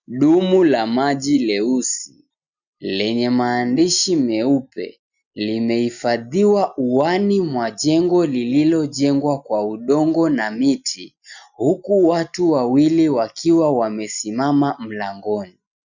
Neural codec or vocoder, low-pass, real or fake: none; 7.2 kHz; real